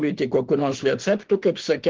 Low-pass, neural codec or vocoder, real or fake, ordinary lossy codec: 7.2 kHz; autoencoder, 48 kHz, 32 numbers a frame, DAC-VAE, trained on Japanese speech; fake; Opus, 16 kbps